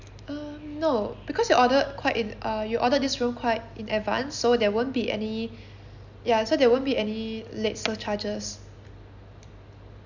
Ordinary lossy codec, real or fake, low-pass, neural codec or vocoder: none; real; 7.2 kHz; none